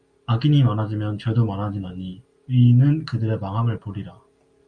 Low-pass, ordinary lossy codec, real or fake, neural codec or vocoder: 9.9 kHz; Opus, 64 kbps; real; none